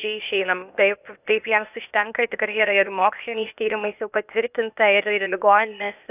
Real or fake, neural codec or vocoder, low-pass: fake; codec, 16 kHz, 0.8 kbps, ZipCodec; 3.6 kHz